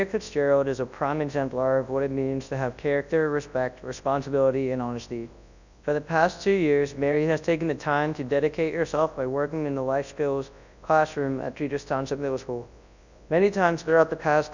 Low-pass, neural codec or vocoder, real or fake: 7.2 kHz; codec, 24 kHz, 0.9 kbps, WavTokenizer, large speech release; fake